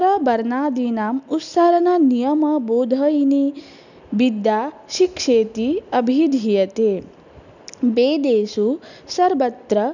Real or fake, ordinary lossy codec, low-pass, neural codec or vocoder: real; none; 7.2 kHz; none